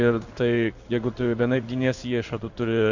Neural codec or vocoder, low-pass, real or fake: codec, 16 kHz in and 24 kHz out, 1 kbps, XY-Tokenizer; 7.2 kHz; fake